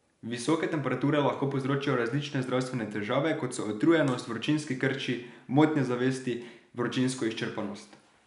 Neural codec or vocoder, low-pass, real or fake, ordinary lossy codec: none; 10.8 kHz; real; none